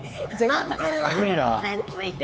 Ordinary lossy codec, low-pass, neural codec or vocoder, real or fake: none; none; codec, 16 kHz, 4 kbps, X-Codec, HuBERT features, trained on LibriSpeech; fake